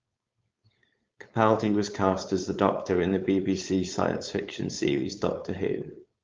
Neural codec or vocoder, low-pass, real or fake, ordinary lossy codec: codec, 16 kHz, 4.8 kbps, FACodec; 7.2 kHz; fake; Opus, 32 kbps